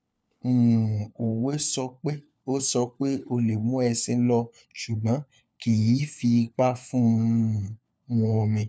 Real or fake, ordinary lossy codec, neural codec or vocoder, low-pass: fake; none; codec, 16 kHz, 4 kbps, FunCodec, trained on LibriTTS, 50 frames a second; none